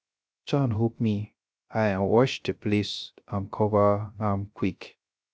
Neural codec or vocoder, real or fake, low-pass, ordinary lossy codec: codec, 16 kHz, 0.3 kbps, FocalCodec; fake; none; none